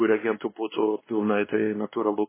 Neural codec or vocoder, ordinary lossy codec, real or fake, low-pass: codec, 16 kHz, 2 kbps, X-Codec, WavLM features, trained on Multilingual LibriSpeech; MP3, 16 kbps; fake; 3.6 kHz